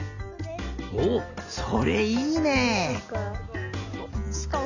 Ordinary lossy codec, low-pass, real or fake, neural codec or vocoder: none; 7.2 kHz; real; none